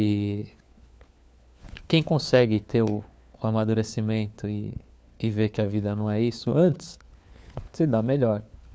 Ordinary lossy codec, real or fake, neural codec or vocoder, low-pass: none; fake; codec, 16 kHz, 4 kbps, FunCodec, trained on LibriTTS, 50 frames a second; none